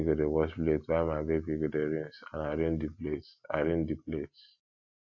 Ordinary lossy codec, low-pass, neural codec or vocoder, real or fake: AAC, 48 kbps; 7.2 kHz; none; real